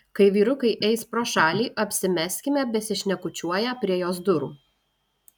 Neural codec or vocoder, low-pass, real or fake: none; 19.8 kHz; real